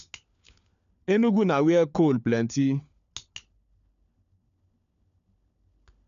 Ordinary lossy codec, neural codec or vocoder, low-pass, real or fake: none; codec, 16 kHz, 4 kbps, FunCodec, trained on LibriTTS, 50 frames a second; 7.2 kHz; fake